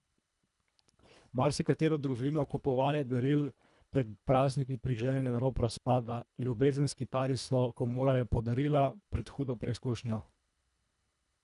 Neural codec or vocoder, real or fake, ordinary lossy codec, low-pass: codec, 24 kHz, 1.5 kbps, HILCodec; fake; none; 10.8 kHz